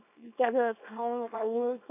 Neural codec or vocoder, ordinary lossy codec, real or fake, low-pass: codec, 24 kHz, 0.9 kbps, WavTokenizer, small release; none; fake; 3.6 kHz